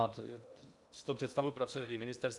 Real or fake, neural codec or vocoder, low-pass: fake; codec, 16 kHz in and 24 kHz out, 0.8 kbps, FocalCodec, streaming, 65536 codes; 10.8 kHz